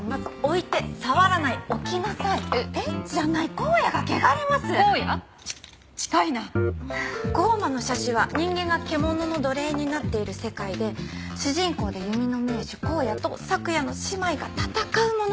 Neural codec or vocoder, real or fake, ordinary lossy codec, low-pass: none; real; none; none